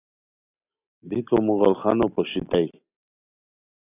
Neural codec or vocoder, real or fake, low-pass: none; real; 3.6 kHz